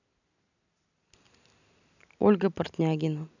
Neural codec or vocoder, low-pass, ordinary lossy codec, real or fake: none; 7.2 kHz; none; real